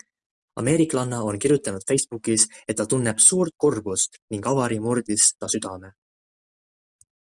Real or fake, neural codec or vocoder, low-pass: real; none; 10.8 kHz